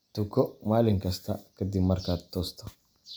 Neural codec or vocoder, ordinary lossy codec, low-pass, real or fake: none; none; none; real